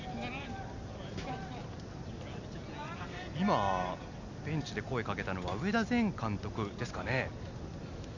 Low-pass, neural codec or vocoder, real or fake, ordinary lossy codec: 7.2 kHz; none; real; none